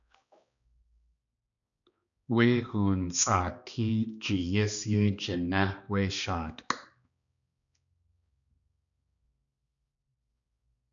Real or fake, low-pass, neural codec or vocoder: fake; 7.2 kHz; codec, 16 kHz, 2 kbps, X-Codec, HuBERT features, trained on balanced general audio